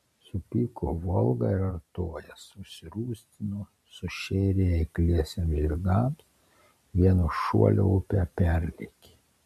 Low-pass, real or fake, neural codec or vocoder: 14.4 kHz; fake; vocoder, 44.1 kHz, 128 mel bands every 512 samples, BigVGAN v2